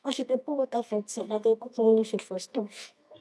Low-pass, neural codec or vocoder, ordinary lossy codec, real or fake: none; codec, 24 kHz, 0.9 kbps, WavTokenizer, medium music audio release; none; fake